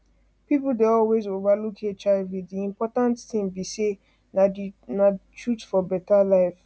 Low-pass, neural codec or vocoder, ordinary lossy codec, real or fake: none; none; none; real